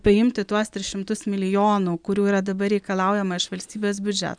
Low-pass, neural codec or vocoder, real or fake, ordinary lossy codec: 9.9 kHz; none; real; MP3, 96 kbps